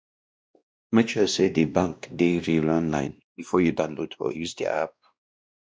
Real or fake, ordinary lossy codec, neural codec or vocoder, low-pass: fake; none; codec, 16 kHz, 1 kbps, X-Codec, WavLM features, trained on Multilingual LibriSpeech; none